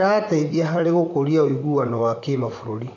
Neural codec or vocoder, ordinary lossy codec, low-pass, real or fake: vocoder, 44.1 kHz, 128 mel bands, Pupu-Vocoder; none; 7.2 kHz; fake